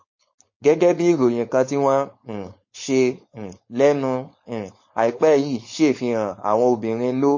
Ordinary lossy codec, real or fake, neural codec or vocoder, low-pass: MP3, 32 kbps; fake; codec, 16 kHz, 4.8 kbps, FACodec; 7.2 kHz